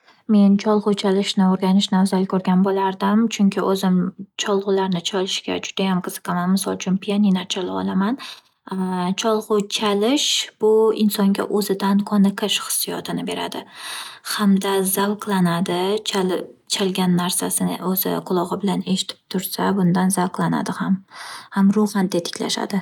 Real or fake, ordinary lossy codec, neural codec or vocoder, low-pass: real; none; none; 19.8 kHz